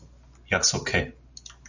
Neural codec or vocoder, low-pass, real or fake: none; 7.2 kHz; real